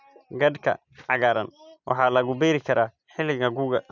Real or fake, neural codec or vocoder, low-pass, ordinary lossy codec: real; none; 7.2 kHz; none